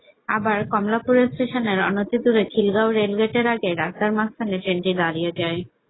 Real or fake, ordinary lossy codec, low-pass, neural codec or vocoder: real; AAC, 16 kbps; 7.2 kHz; none